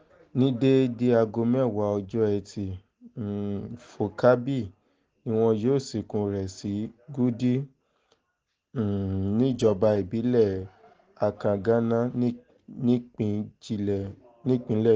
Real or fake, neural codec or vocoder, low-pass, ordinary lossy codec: real; none; 7.2 kHz; Opus, 16 kbps